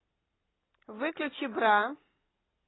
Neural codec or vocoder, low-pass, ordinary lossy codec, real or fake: none; 7.2 kHz; AAC, 16 kbps; real